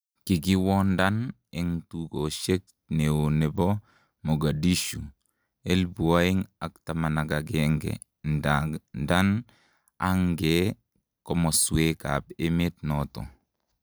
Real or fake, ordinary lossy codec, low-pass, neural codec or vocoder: real; none; none; none